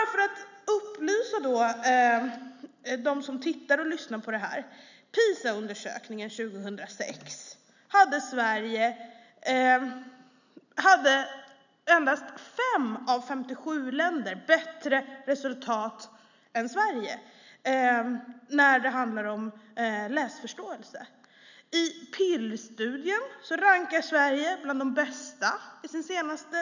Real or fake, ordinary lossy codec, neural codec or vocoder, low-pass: real; none; none; 7.2 kHz